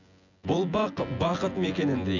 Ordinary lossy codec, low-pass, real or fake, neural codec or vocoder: none; 7.2 kHz; fake; vocoder, 24 kHz, 100 mel bands, Vocos